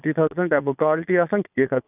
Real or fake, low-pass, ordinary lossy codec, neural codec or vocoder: fake; 3.6 kHz; none; vocoder, 22.05 kHz, 80 mel bands, Vocos